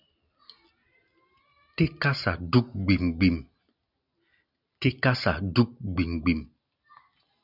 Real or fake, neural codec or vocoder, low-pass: real; none; 5.4 kHz